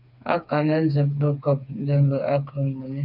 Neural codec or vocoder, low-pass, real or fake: codec, 16 kHz, 4 kbps, FreqCodec, smaller model; 5.4 kHz; fake